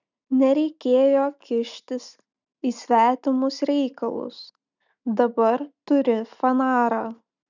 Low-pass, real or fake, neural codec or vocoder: 7.2 kHz; real; none